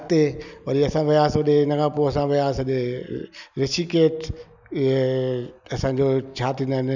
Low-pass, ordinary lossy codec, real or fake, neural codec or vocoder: 7.2 kHz; none; real; none